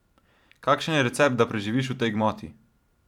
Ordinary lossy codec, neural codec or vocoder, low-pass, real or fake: none; vocoder, 44.1 kHz, 128 mel bands every 512 samples, BigVGAN v2; 19.8 kHz; fake